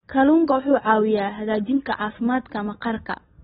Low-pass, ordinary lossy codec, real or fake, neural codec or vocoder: 7.2 kHz; AAC, 16 kbps; real; none